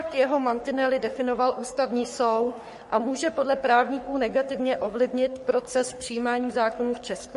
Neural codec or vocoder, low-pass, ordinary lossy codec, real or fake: codec, 44.1 kHz, 3.4 kbps, Pupu-Codec; 14.4 kHz; MP3, 48 kbps; fake